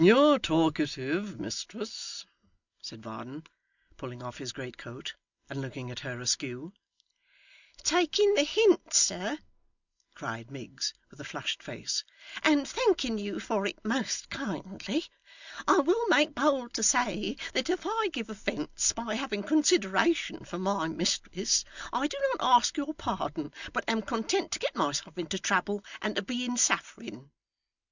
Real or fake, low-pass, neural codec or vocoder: real; 7.2 kHz; none